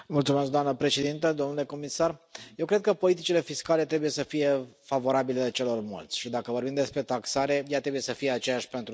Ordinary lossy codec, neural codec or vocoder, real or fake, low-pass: none; none; real; none